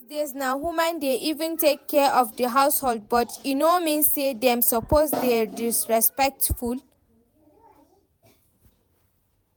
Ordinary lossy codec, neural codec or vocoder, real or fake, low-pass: none; vocoder, 48 kHz, 128 mel bands, Vocos; fake; none